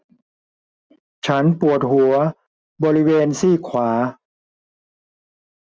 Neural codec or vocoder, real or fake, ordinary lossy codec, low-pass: none; real; none; none